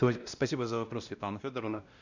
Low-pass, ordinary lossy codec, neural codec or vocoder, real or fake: 7.2 kHz; Opus, 64 kbps; codec, 16 kHz, 1 kbps, X-Codec, WavLM features, trained on Multilingual LibriSpeech; fake